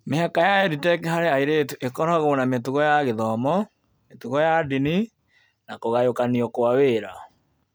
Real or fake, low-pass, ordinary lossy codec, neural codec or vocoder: real; none; none; none